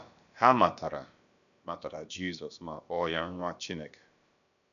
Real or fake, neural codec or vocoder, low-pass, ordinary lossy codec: fake; codec, 16 kHz, about 1 kbps, DyCAST, with the encoder's durations; 7.2 kHz; none